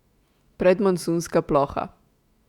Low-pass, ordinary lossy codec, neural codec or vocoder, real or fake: 19.8 kHz; Opus, 64 kbps; autoencoder, 48 kHz, 128 numbers a frame, DAC-VAE, trained on Japanese speech; fake